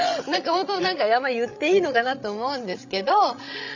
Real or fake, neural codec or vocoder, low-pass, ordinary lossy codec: fake; codec, 16 kHz, 16 kbps, FreqCodec, larger model; 7.2 kHz; none